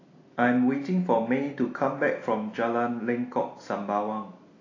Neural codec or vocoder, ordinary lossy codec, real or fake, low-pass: none; AAC, 32 kbps; real; 7.2 kHz